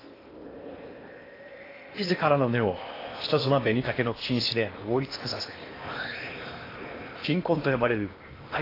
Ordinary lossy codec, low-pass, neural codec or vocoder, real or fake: AAC, 24 kbps; 5.4 kHz; codec, 16 kHz in and 24 kHz out, 0.8 kbps, FocalCodec, streaming, 65536 codes; fake